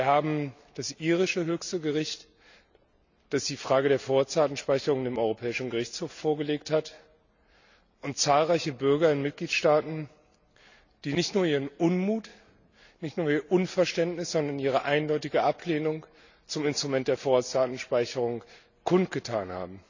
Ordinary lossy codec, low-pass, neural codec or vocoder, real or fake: none; 7.2 kHz; none; real